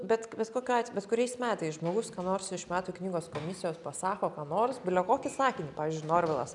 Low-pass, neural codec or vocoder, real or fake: 10.8 kHz; none; real